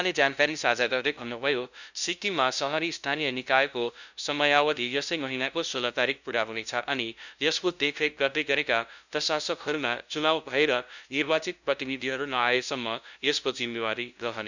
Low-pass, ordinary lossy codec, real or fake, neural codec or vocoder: 7.2 kHz; none; fake; codec, 16 kHz, 0.5 kbps, FunCodec, trained on LibriTTS, 25 frames a second